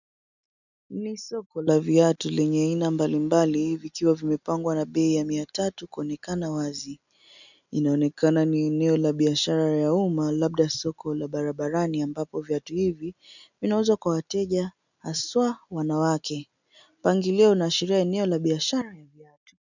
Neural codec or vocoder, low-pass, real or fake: none; 7.2 kHz; real